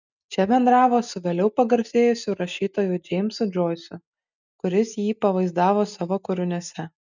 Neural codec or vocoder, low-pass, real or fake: none; 7.2 kHz; real